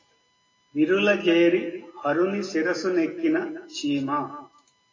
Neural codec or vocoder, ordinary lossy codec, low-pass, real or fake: none; AAC, 32 kbps; 7.2 kHz; real